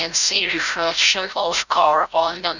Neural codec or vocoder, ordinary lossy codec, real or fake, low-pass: codec, 16 kHz, 0.5 kbps, FreqCodec, larger model; none; fake; 7.2 kHz